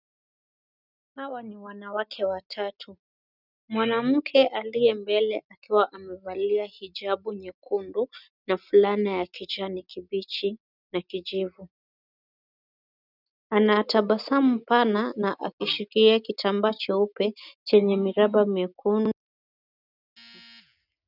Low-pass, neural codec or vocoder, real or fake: 5.4 kHz; none; real